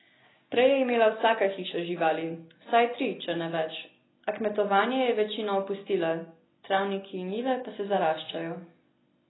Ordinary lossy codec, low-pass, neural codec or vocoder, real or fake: AAC, 16 kbps; 7.2 kHz; none; real